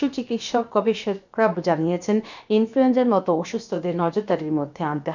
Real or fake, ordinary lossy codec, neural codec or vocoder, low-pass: fake; none; codec, 16 kHz, about 1 kbps, DyCAST, with the encoder's durations; 7.2 kHz